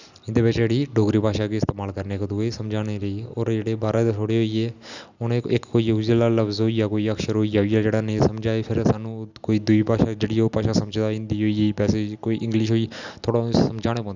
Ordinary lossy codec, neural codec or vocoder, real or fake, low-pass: Opus, 64 kbps; none; real; 7.2 kHz